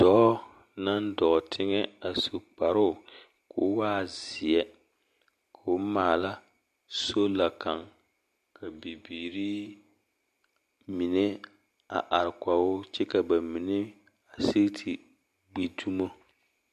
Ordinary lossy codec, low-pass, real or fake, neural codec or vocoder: MP3, 64 kbps; 14.4 kHz; real; none